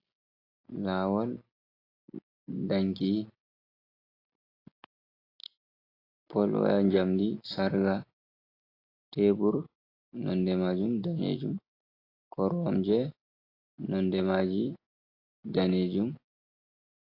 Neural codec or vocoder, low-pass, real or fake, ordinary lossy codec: none; 5.4 kHz; real; AAC, 32 kbps